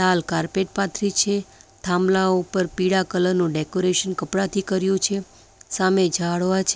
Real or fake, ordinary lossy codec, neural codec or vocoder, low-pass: real; none; none; none